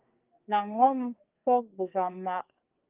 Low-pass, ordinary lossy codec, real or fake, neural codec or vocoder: 3.6 kHz; Opus, 24 kbps; fake; codec, 44.1 kHz, 2.6 kbps, SNAC